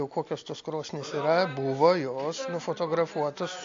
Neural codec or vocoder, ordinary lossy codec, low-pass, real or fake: none; AAC, 48 kbps; 7.2 kHz; real